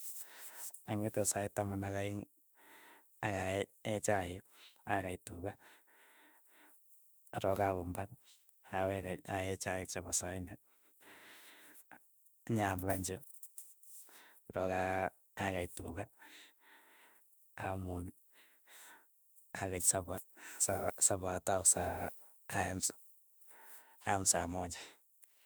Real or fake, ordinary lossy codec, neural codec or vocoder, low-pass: fake; none; autoencoder, 48 kHz, 32 numbers a frame, DAC-VAE, trained on Japanese speech; none